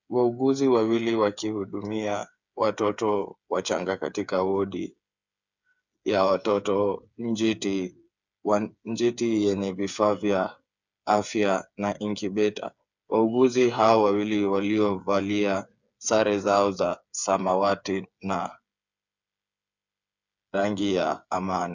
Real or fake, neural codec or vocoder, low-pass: fake; codec, 16 kHz, 8 kbps, FreqCodec, smaller model; 7.2 kHz